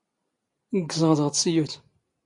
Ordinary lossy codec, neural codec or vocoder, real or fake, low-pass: MP3, 48 kbps; none; real; 10.8 kHz